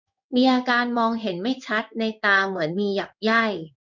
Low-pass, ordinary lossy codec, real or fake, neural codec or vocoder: 7.2 kHz; none; fake; codec, 16 kHz in and 24 kHz out, 1 kbps, XY-Tokenizer